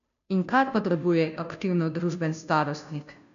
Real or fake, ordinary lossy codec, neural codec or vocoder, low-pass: fake; none; codec, 16 kHz, 0.5 kbps, FunCodec, trained on Chinese and English, 25 frames a second; 7.2 kHz